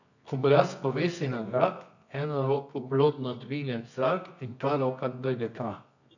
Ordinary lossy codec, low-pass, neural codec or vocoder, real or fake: none; 7.2 kHz; codec, 24 kHz, 0.9 kbps, WavTokenizer, medium music audio release; fake